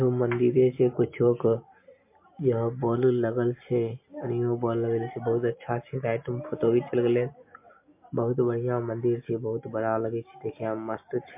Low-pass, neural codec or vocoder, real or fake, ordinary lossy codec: 3.6 kHz; none; real; MP3, 24 kbps